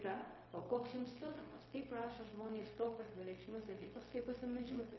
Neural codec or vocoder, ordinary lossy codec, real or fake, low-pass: codec, 16 kHz, 0.4 kbps, LongCat-Audio-Codec; MP3, 24 kbps; fake; 7.2 kHz